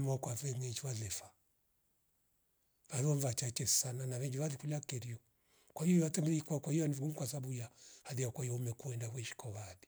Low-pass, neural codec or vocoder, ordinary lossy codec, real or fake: none; none; none; real